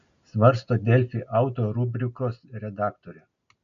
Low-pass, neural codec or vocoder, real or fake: 7.2 kHz; none; real